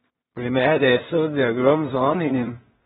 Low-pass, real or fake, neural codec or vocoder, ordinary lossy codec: 10.8 kHz; fake; codec, 16 kHz in and 24 kHz out, 0.4 kbps, LongCat-Audio-Codec, two codebook decoder; AAC, 16 kbps